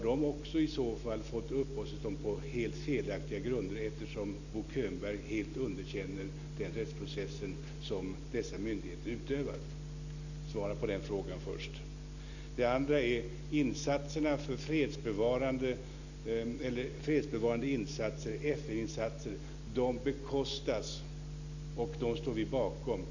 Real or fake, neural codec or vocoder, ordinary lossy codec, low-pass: real; none; none; 7.2 kHz